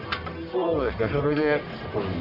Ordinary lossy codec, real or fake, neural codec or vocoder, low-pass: none; fake; codec, 44.1 kHz, 1.7 kbps, Pupu-Codec; 5.4 kHz